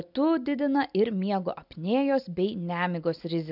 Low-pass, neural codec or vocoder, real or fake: 5.4 kHz; none; real